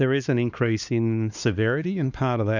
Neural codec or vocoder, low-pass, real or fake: codec, 16 kHz, 2 kbps, X-Codec, HuBERT features, trained on LibriSpeech; 7.2 kHz; fake